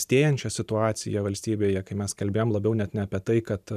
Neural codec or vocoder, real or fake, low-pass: vocoder, 44.1 kHz, 128 mel bands every 512 samples, BigVGAN v2; fake; 14.4 kHz